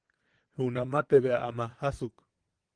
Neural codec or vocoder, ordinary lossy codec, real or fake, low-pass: vocoder, 22.05 kHz, 80 mel bands, WaveNeXt; Opus, 24 kbps; fake; 9.9 kHz